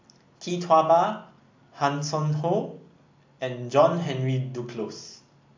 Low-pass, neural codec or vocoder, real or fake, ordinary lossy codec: 7.2 kHz; none; real; MP3, 64 kbps